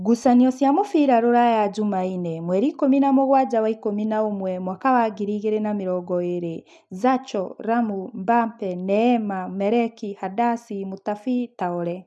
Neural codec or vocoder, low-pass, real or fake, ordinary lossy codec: none; none; real; none